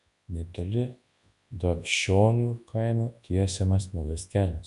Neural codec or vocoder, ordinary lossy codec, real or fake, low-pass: codec, 24 kHz, 0.9 kbps, WavTokenizer, large speech release; MP3, 64 kbps; fake; 10.8 kHz